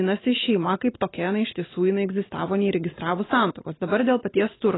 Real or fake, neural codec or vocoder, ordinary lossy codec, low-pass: real; none; AAC, 16 kbps; 7.2 kHz